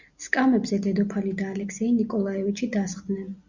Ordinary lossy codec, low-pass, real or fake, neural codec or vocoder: Opus, 64 kbps; 7.2 kHz; real; none